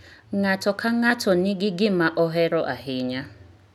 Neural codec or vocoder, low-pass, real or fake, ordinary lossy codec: none; 19.8 kHz; real; none